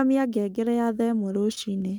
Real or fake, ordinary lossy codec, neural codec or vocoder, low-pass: fake; none; codec, 44.1 kHz, 7.8 kbps, Pupu-Codec; none